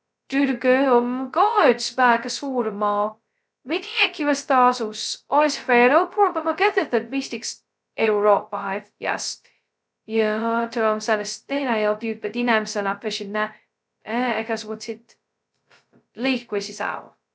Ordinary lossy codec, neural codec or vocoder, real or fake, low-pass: none; codec, 16 kHz, 0.2 kbps, FocalCodec; fake; none